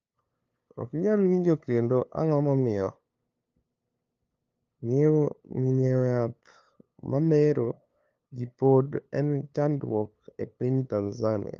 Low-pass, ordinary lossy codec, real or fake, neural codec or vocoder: 7.2 kHz; Opus, 24 kbps; fake; codec, 16 kHz, 2 kbps, FunCodec, trained on LibriTTS, 25 frames a second